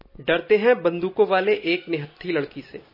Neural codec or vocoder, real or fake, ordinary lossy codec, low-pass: none; real; MP3, 24 kbps; 5.4 kHz